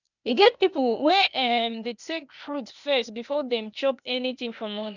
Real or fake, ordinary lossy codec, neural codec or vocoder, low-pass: fake; none; codec, 16 kHz, 0.8 kbps, ZipCodec; 7.2 kHz